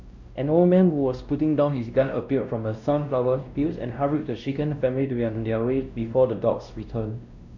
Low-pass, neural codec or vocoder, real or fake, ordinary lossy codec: 7.2 kHz; codec, 16 kHz, 1 kbps, X-Codec, WavLM features, trained on Multilingual LibriSpeech; fake; none